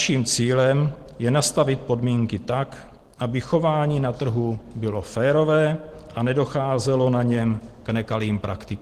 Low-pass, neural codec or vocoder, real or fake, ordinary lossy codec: 14.4 kHz; none; real; Opus, 16 kbps